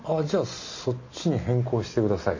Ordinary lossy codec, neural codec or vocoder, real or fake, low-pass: MP3, 32 kbps; none; real; 7.2 kHz